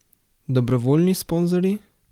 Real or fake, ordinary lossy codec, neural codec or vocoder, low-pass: real; Opus, 24 kbps; none; 19.8 kHz